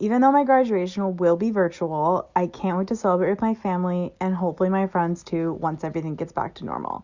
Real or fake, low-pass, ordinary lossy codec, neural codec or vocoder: real; 7.2 kHz; Opus, 64 kbps; none